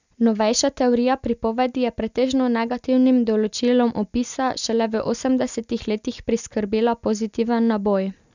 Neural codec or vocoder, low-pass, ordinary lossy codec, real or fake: none; 7.2 kHz; none; real